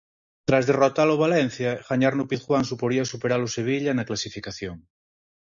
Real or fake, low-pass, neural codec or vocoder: real; 7.2 kHz; none